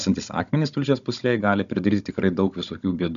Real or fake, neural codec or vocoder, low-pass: fake; codec, 16 kHz, 16 kbps, FunCodec, trained on Chinese and English, 50 frames a second; 7.2 kHz